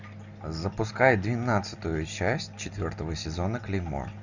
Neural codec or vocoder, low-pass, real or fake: none; 7.2 kHz; real